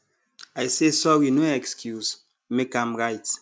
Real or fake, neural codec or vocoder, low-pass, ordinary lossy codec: real; none; none; none